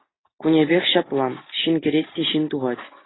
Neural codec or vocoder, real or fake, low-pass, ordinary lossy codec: none; real; 7.2 kHz; AAC, 16 kbps